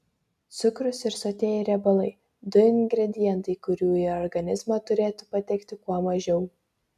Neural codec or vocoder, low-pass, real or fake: none; 14.4 kHz; real